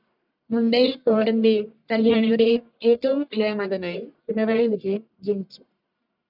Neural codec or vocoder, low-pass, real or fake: codec, 44.1 kHz, 1.7 kbps, Pupu-Codec; 5.4 kHz; fake